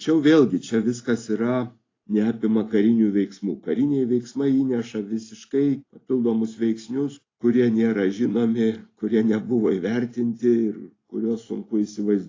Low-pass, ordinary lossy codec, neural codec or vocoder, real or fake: 7.2 kHz; AAC, 32 kbps; none; real